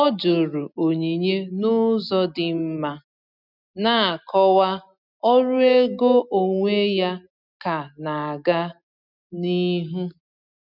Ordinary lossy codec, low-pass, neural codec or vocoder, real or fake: none; 5.4 kHz; none; real